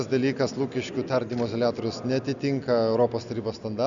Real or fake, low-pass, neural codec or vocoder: real; 7.2 kHz; none